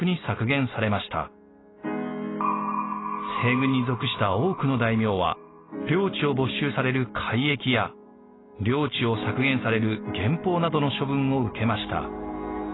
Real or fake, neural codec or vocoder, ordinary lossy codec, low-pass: real; none; AAC, 16 kbps; 7.2 kHz